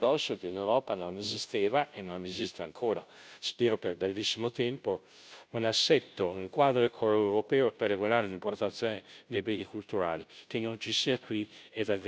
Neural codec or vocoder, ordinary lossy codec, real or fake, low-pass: codec, 16 kHz, 0.5 kbps, FunCodec, trained on Chinese and English, 25 frames a second; none; fake; none